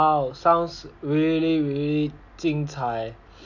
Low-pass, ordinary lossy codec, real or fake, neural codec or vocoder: 7.2 kHz; none; real; none